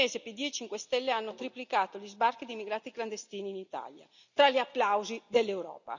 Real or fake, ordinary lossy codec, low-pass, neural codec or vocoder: real; none; 7.2 kHz; none